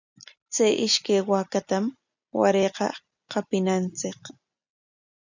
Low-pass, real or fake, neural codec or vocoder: 7.2 kHz; real; none